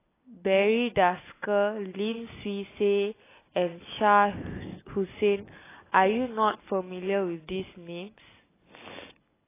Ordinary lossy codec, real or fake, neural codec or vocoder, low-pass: AAC, 16 kbps; real; none; 3.6 kHz